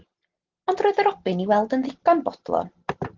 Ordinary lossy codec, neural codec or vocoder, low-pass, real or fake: Opus, 16 kbps; none; 7.2 kHz; real